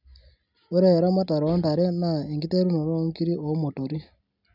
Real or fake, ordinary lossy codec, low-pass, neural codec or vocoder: real; none; 5.4 kHz; none